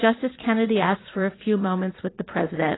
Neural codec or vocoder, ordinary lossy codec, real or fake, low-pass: vocoder, 44.1 kHz, 80 mel bands, Vocos; AAC, 16 kbps; fake; 7.2 kHz